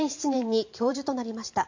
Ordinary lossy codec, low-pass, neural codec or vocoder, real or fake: MP3, 48 kbps; 7.2 kHz; vocoder, 44.1 kHz, 128 mel bands every 512 samples, BigVGAN v2; fake